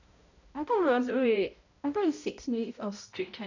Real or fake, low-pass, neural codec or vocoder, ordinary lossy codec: fake; 7.2 kHz; codec, 16 kHz, 0.5 kbps, X-Codec, HuBERT features, trained on balanced general audio; none